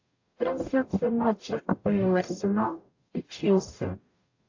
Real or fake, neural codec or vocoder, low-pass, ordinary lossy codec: fake; codec, 44.1 kHz, 0.9 kbps, DAC; 7.2 kHz; AAC, 48 kbps